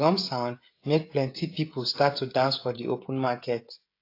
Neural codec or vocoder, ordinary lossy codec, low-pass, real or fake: codec, 16 kHz, 16 kbps, FreqCodec, smaller model; AAC, 32 kbps; 5.4 kHz; fake